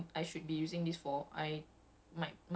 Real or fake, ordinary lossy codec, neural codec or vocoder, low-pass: real; none; none; none